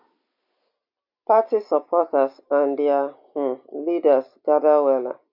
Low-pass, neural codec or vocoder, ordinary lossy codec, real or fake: 5.4 kHz; none; MP3, 48 kbps; real